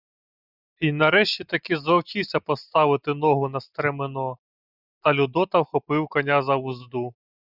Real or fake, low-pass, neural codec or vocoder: real; 5.4 kHz; none